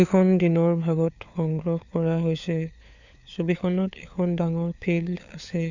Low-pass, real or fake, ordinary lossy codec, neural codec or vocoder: 7.2 kHz; fake; none; codec, 16 kHz, 4 kbps, FunCodec, trained on LibriTTS, 50 frames a second